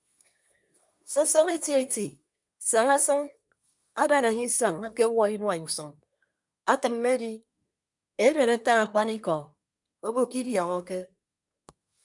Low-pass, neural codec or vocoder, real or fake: 10.8 kHz; codec, 24 kHz, 1 kbps, SNAC; fake